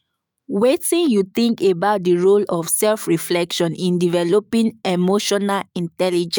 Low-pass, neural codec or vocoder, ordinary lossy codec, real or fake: none; autoencoder, 48 kHz, 128 numbers a frame, DAC-VAE, trained on Japanese speech; none; fake